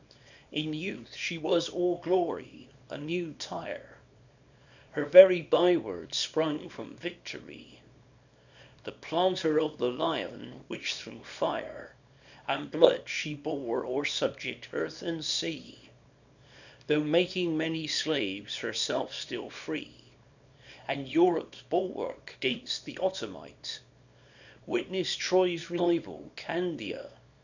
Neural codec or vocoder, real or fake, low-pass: codec, 24 kHz, 0.9 kbps, WavTokenizer, small release; fake; 7.2 kHz